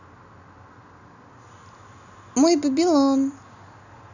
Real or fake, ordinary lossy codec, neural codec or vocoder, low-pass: real; none; none; 7.2 kHz